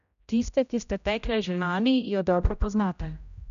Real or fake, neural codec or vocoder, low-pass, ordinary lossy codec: fake; codec, 16 kHz, 0.5 kbps, X-Codec, HuBERT features, trained on general audio; 7.2 kHz; none